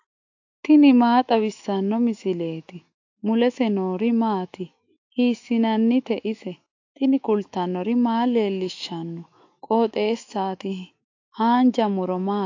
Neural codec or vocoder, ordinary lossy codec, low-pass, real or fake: autoencoder, 48 kHz, 128 numbers a frame, DAC-VAE, trained on Japanese speech; AAC, 48 kbps; 7.2 kHz; fake